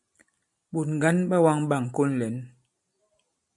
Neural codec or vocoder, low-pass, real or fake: none; 10.8 kHz; real